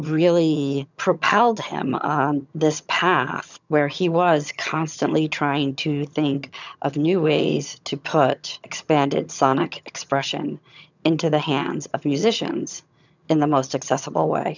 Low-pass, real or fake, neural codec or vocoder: 7.2 kHz; fake; vocoder, 22.05 kHz, 80 mel bands, HiFi-GAN